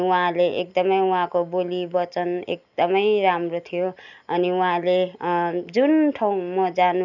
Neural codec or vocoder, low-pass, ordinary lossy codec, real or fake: none; 7.2 kHz; none; real